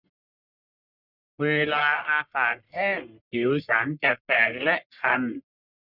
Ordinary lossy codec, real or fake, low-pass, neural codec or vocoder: none; fake; 5.4 kHz; codec, 44.1 kHz, 1.7 kbps, Pupu-Codec